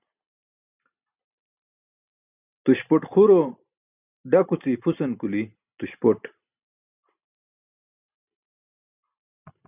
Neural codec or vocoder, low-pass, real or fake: none; 3.6 kHz; real